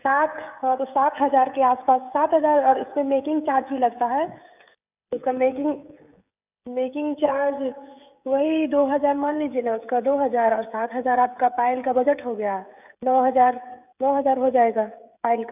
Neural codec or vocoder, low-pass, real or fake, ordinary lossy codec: codec, 16 kHz, 16 kbps, FreqCodec, smaller model; 3.6 kHz; fake; none